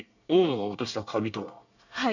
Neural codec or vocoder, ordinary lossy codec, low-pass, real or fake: codec, 24 kHz, 1 kbps, SNAC; none; 7.2 kHz; fake